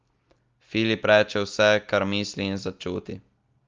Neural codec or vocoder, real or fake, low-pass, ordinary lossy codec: none; real; 7.2 kHz; Opus, 32 kbps